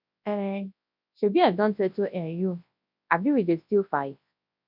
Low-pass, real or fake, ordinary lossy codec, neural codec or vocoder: 5.4 kHz; fake; none; codec, 24 kHz, 0.9 kbps, WavTokenizer, large speech release